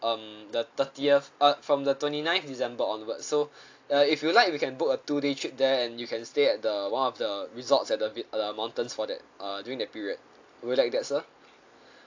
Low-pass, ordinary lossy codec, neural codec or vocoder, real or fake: 7.2 kHz; AAC, 48 kbps; none; real